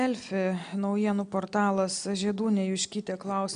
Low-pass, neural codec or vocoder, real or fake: 9.9 kHz; none; real